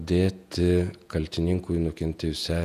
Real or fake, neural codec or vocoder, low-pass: fake; vocoder, 48 kHz, 128 mel bands, Vocos; 14.4 kHz